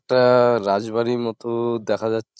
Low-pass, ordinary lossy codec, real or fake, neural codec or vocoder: none; none; fake; codec, 16 kHz, 16 kbps, FreqCodec, larger model